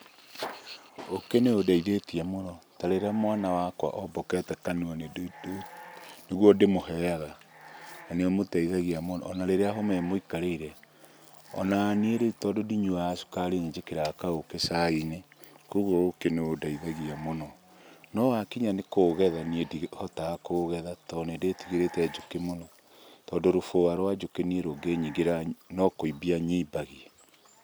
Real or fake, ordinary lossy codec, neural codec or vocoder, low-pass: real; none; none; none